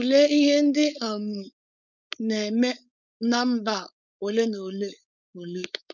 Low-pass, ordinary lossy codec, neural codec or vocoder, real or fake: 7.2 kHz; none; codec, 16 kHz, 4.8 kbps, FACodec; fake